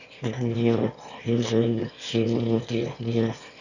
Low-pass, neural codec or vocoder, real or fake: 7.2 kHz; autoencoder, 22.05 kHz, a latent of 192 numbers a frame, VITS, trained on one speaker; fake